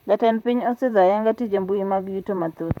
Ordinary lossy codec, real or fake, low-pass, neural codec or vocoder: none; fake; 19.8 kHz; vocoder, 44.1 kHz, 128 mel bands, Pupu-Vocoder